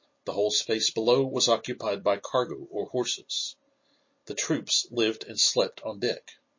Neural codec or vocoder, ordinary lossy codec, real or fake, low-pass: vocoder, 44.1 kHz, 128 mel bands every 256 samples, BigVGAN v2; MP3, 32 kbps; fake; 7.2 kHz